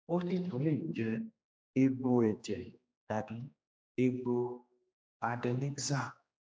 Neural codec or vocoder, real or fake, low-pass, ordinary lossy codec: codec, 16 kHz, 2 kbps, X-Codec, HuBERT features, trained on general audio; fake; none; none